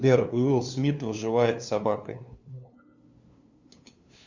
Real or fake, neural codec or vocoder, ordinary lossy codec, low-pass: fake; codec, 16 kHz, 2 kbps, FunCodec, trained on LibriTTS, 25 frames a second; Opus, 64 kbps; 7.2 kHz